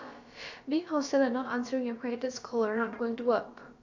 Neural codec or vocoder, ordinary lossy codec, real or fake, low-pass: codec, 16 kHz, about 1 kbps, DyCAST, with the encoder's durations; AAC, 48 kbps; fake; 7.2 kHz